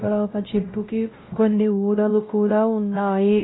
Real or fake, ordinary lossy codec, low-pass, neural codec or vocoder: fake; AAC, 16 kbps; 7.2 kHz; codec, 16 kHz, 0.5 kbps, X-Codec, WavLM features, trained on Multilingual LibriSpeech